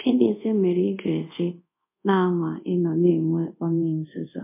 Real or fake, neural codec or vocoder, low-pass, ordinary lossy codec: fake; codec, 24 kHz, 0.5 kbps, DualCodec; 3.6 kHz; MP3, 24 kbps